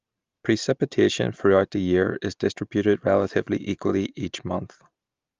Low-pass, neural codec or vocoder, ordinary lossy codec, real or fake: 7.2 kHz; none; Opus, 32 kbps; real